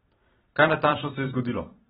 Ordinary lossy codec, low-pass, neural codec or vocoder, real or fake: AAC, 16 kbps; 19.8 kHz; vocoder, 44.1 kHz, 128 mel bands every 256 samples, BigVGAN v2; fake